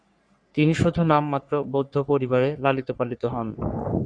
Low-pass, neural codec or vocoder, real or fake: 9.9 kHz; codec, 44.1 kHz, 3.4 kbps, Pupu-Codec; fake